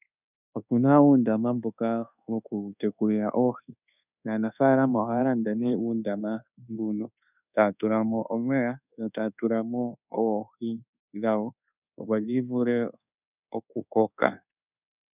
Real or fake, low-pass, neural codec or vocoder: fake; 3.6 kHz; codec, 24 kHz, 1.2 kbps, DualCodec